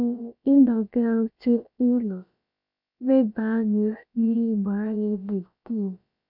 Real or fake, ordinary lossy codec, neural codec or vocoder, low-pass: fake; MP3, 48 kbps; codec, 16 kHz, about 1 kbps, DyCAST, with the encoder's durations; 5.4 kHz